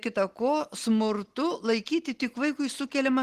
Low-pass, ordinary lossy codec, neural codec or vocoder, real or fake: 14.4 kHz; Opus, 16 kbps; none; real